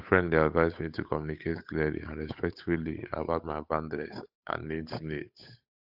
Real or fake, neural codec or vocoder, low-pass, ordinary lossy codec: fake; codec, 16 kHz, 8 kbps, FunCodec, trained on Chinese and English, 25 frames a second; 5.4 kHz; AAC, 48 kbps